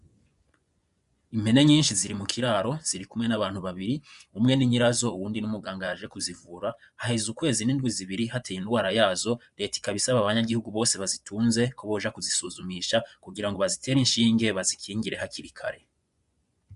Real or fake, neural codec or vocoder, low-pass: fake; vocoder, 24 kHz, 100 mel bands, Vocos; 10.8 kHz